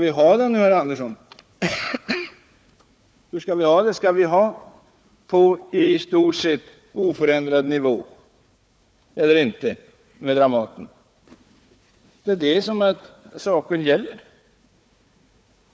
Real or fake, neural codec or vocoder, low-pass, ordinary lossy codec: fake; codec, 16 kHz, 4 kbps, FunCodec, trained on Chinese and English, 50 frames a second; none; none